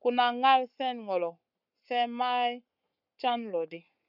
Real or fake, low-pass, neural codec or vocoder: fake; 5.4 kHz; codec, 44.1 kHz, 7.8 kbps, Pupu-Codec